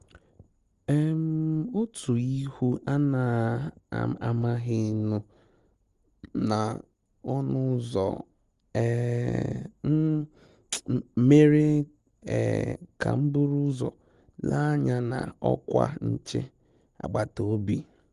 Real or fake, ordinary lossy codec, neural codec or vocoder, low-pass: real; Opus, 32 kbps; none; 10.8 kHz